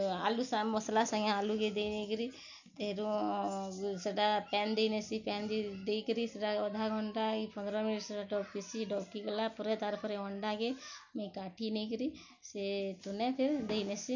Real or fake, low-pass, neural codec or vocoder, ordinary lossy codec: real; 7.2 kHz; none; none